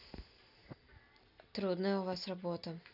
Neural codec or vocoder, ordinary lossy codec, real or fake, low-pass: none; none; real; 5.4 kHz